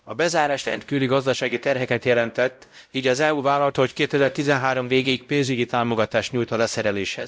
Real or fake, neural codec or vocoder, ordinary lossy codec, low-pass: fake; codec, 16 kHz, 0.5 kbps, X-Codec, WavLM features, trained on Multilingual LibriSpeech; none; none